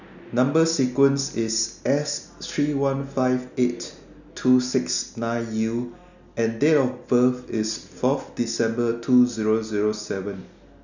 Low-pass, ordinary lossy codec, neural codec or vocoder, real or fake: 7.2 kHz; none; none; real